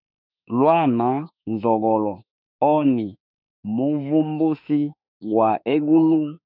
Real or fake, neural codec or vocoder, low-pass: fake; autoencoder, 48 kHz, 32 numbers a frame, DAC-VAE, trained on Japanese speech; 5.4 kHz